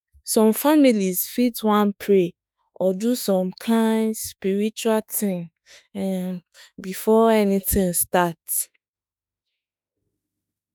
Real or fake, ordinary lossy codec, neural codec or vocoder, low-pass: fake; none; autoencoder, 48 kHz, 32 numbers a frame, DAC-VAE, trained on Japanese speech; none